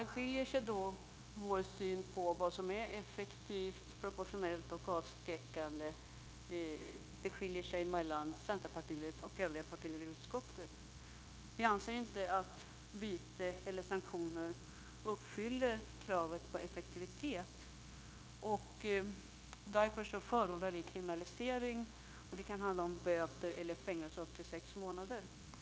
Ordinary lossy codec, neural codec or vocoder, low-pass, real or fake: none; codec, 16 kHz, 0.9 kbps, LongCat-Audio-Codec; none; fake